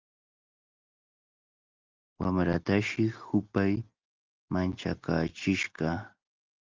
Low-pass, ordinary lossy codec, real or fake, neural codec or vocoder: 7.2 kHz; Opus, 32 kbps; real; none